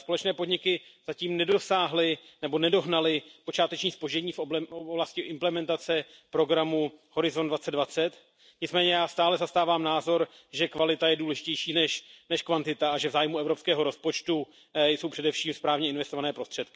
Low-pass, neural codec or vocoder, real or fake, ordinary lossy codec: none; none; real; none